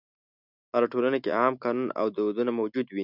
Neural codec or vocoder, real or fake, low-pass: none; real; 5.4 kHz